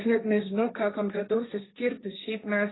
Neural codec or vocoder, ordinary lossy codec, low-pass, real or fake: codec, 16 kHz, 1.1 kbps, Voila-Tokenizer; AAC, 16 kbps; 7.2 kHz; fake